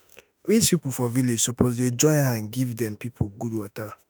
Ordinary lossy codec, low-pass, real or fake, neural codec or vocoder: none; none; fake; autoencoder, 48 kHz, 32 numbers a frame, DAC-VAE, trained on Japanese speech